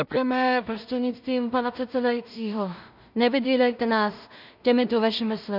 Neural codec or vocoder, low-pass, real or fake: codec, 16 kHz in and 24 kHz out, 0.4 kbps, LongCat-Audio-Codec, two codebook decoder; 5.4 kHz; fake